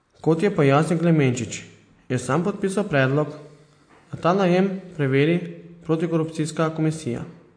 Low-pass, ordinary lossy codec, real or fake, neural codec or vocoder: 9.9 kHz; MP3, 48 kbps; real; none